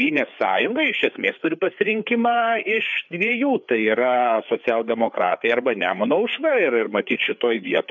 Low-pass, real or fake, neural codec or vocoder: 7.2 kHz; fake; codec, 16 kHz, 4 kbps, FreqCodec, larger model